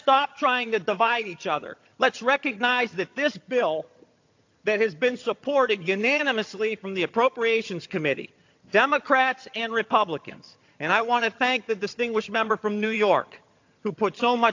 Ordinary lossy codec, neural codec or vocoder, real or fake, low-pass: AAC, 48 kbps; vocoder, 22.05 kHz, 80 mel bands, HiFi-GAN; fake; 7.2 kHz